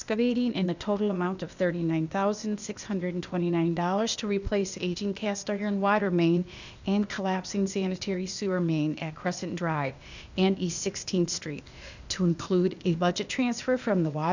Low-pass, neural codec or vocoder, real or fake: 7.2 kHz; codec, 16 kHz, 0.8 kbps, ZipCodec; fake